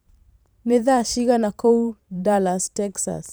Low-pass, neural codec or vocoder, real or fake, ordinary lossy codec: none; vocoder, 44.1 kHz, 128 mel bands every 512 samples, BigVGAN v2; fake; none